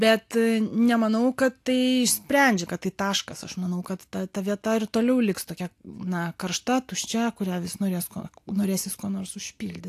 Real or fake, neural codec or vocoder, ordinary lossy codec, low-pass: real; none; AAC, 64 kbps; 14.4 kHz